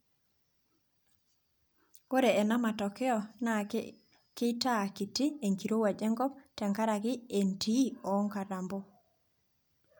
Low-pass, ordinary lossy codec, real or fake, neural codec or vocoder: none; none; real; none